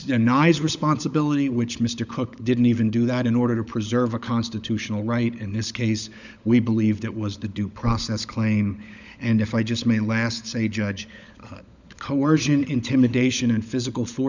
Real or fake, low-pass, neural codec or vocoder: fake; 7.2 kHz; codec, 16 kHz, 16 kbps, FunCodec, trained on LibriTTS, 50 frames a second